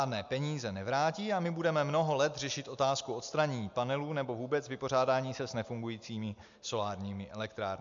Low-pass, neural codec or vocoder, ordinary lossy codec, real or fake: 7.2 kHz; none; MP3, 64 kbps; real